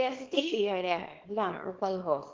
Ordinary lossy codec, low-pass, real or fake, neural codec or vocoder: Opus, 16 kbps; 7.2 kHz; fake; codec, 24 kHz, 0.9 kbps, WavTokenizer, small release